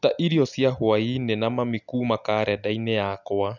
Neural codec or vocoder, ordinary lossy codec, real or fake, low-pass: none; none; real; 7.2 kHz